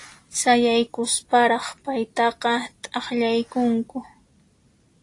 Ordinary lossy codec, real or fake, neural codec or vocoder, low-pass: AAC, 48 kbps; real; none; 10.8 kHz